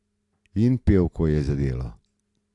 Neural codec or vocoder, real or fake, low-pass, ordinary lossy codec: none; real; 10.8 kHz; AAC, 48 kbps